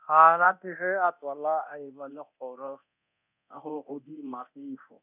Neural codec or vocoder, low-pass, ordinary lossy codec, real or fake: codec, 24 kHz, 0.9 kbps, DualCodec; 3.6 kHz; none; fake